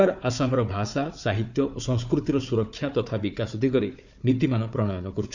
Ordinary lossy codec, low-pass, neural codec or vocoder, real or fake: none; 7.2 kHz; codec, 16 kHz, 4 kbps, FunCodec, trained on Chinese and English, 50 frames a second; fake